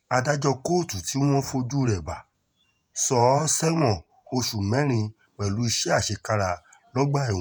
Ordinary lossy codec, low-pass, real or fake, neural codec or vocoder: none; none; fake; vocoder, 48 kHz, 128 mel bands, Vocos